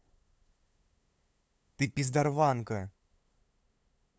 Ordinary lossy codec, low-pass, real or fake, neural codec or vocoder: none; none; fake; codec, 16 kHz, 8 kbps, FunCodec, trained on LibriTTS, 25 frames a second